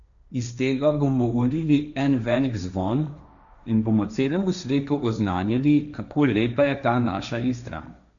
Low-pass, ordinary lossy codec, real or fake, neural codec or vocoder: 7.2 kHz; none; fake; codec, 16 kHz, 1.1 kbps, Voila-Tokenizer